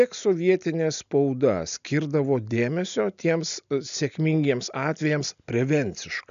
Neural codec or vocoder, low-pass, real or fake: none; 7.2 kHz; real